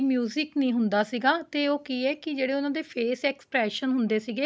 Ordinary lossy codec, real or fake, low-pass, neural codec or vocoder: none; real; none; none